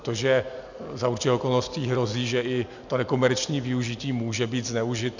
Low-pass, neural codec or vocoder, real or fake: 7.2 kHz; none; real